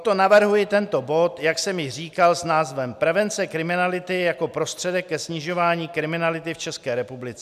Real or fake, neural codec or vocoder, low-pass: real; none; 14.4 kHz